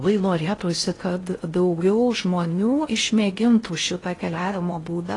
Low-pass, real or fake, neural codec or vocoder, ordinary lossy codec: 10.8 kHz; fake; codec, 16 kHz in and 24 kHz out, 0.6 kbps, FocalCodec, streaming, 4096 codes; AAC, 32 kbps